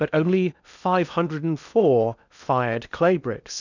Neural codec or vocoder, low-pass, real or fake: codec, 16 kHz in and 24 kHz out, 0.8 kbps, FocalCodec, streaming, 65536 codes; 7.2 kHz; fake